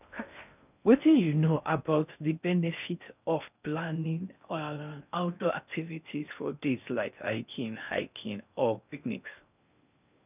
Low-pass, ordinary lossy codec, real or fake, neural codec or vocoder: 3.6 kHz; none; fake; codec, 16 kHz in and 24 kHz out, 0.6 kbps, FocalCodec, streaming, 2048 codes